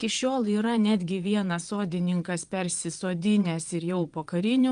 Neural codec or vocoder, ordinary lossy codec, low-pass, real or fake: vocoder, 22.05 kHz, 80 mel bands, Vocos; Opus, 32 kbps; 9.9 kHz; fake